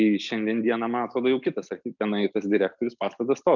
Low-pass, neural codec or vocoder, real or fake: 7.2 kHz; none; real